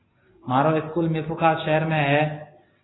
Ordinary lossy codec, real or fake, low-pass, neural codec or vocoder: AAC, 16 kbps; real; 7.2 kHz; none